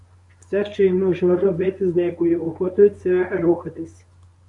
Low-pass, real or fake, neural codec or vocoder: 10.8 kHz; fake; codec, 24 kHz, 0.9 kbps, WavTokenizer, medium speech release version 1